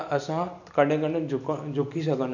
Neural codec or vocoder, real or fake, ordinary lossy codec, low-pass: none; real; none; 7.2 kHz